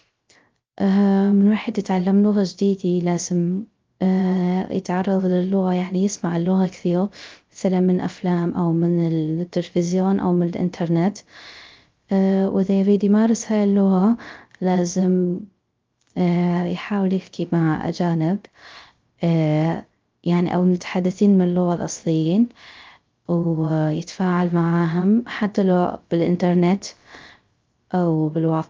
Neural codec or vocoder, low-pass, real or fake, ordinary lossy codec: codec, 16 kHz, 0.3 kbps, FocalCodec; 7.2 kHz; fake; Opus, 24 kbps